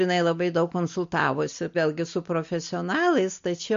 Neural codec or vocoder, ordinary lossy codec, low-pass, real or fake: none; MP3, 48 kbps; 7.2 kHz; real